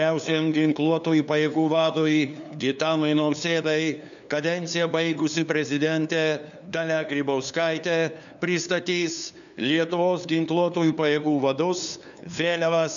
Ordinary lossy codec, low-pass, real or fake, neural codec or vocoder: MP3, 96 kbps; 7.2 kHz; fake; codec, 16 kHz, 2 kbps, FunCodec, trained on LibriTTS, 25 frames a second